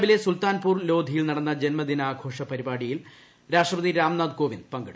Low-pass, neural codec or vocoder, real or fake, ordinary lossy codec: none; none; real; none